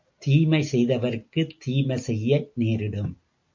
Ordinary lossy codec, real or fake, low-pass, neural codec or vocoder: MP3, 48 kbps; real; 7.2 kHz; none